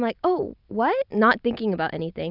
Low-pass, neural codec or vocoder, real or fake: 5.4 kHz; none; real